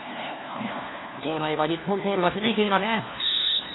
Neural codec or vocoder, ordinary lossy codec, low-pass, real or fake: codec, 16 kHz, 1 kbps, FunCodec, trained on LibriTTS, 50 frames a second; AAC, 16 kbps; 7.2 kHz; fake